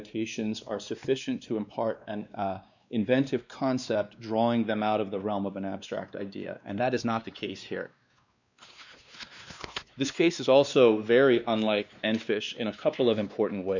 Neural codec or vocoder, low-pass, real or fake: codec, 16 kHz, 2 kbps, X-Codec, WavLM features, trained on Multilingual LibriSpeech; 7.2 kHz; fake